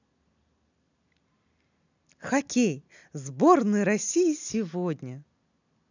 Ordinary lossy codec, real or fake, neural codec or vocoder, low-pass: none; real; none; 7.2 kHz